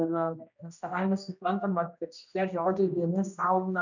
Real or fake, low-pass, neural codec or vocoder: fake; 7.2 kHz; codec, 16 kHz, 1 kbps, X-Codec, HuBERT features, trained on general audio